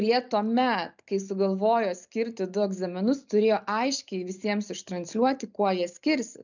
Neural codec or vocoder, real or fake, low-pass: none; real; 7.2 kHz